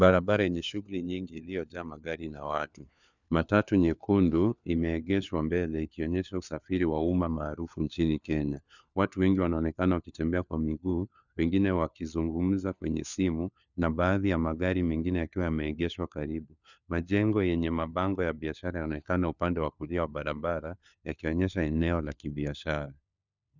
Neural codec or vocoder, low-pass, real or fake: codec, 16 kHz, 4 kbps, FunCodec, trained on LibriTTS, 50 frames a second; 7.2 kHz; fake